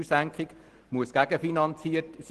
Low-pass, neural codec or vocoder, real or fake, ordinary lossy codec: 10.8 kHz; none; real; Opus, 16 kbps